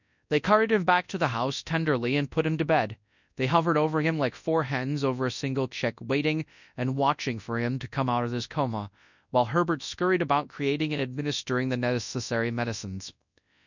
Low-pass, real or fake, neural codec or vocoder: 7.2 kHz; fake; codec, 24 kHz, 0.9 kbps, WavTokenizer, large speech release